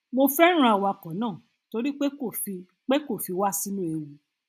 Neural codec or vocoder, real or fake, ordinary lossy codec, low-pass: none; real; none; 14.4 kHz